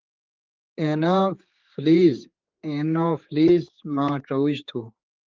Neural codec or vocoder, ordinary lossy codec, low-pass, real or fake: codec, 16 kHz, 4 kbps, X-Codec, HuBERT features, trained on general audio; Opus, 32 kbps; 7.2 kHz; fake